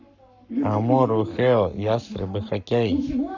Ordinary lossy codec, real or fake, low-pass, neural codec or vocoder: none; fake; 7.2 kHz; codec, 44.1 kHz, 7.8 kbps, Pupu-Codec